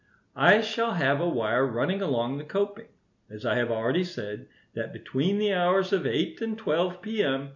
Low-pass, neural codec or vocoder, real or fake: 7.2 kHz; none; real